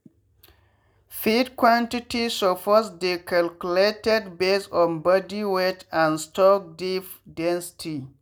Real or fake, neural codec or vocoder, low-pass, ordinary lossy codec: real; none; none; none